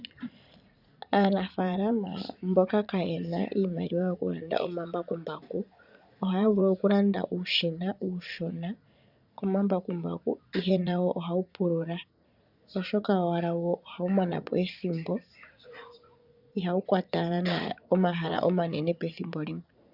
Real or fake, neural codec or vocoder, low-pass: fake; vocoder, 44.1 kHz, 80 mel bands, Vocos; 5.4 kHz